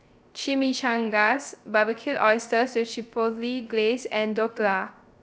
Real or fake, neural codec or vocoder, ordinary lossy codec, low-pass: fake; codec, 16 kHz, 0.3 kbps, FocalCodec; none; none